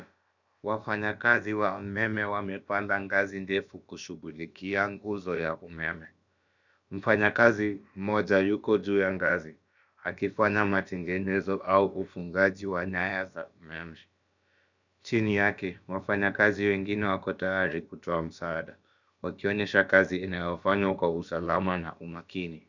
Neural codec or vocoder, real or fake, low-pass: codec, 16 kHz, about 1 kbps, DyCAST, with the encoder's durations; fake; 7.2 kHz